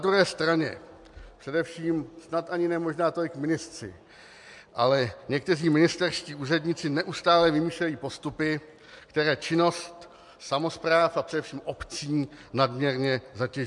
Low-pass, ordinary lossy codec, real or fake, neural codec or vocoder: 10.8 kHz; MP3, 64 kbps; real; none